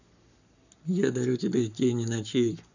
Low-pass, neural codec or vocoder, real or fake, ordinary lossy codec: 7.2 kHz; codec, 44.1 kHz, 7.8 kbps, Pupu-Codec; fake; none